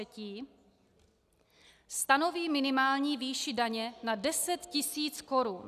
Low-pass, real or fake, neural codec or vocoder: 14.4 kHz; real; none